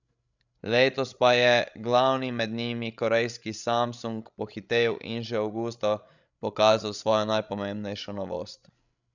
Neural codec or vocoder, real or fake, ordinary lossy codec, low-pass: codec, 16 kHz, 16 kbps, FreqCodec, larger model; fake; none; 7.2 kHz